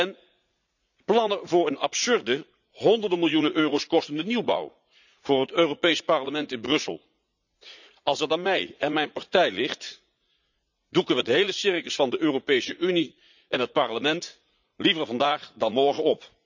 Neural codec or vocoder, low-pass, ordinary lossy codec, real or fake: vocoder, 44.1 kHz, 80 mel bands, Vocos; 7.2 kHz; none; fake